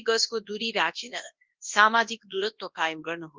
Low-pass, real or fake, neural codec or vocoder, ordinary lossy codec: 7.2 kHz; fake; codec, 24 kHz, 0.9 kbps, WavTokenizer, large speech release; Opus, 32 kbps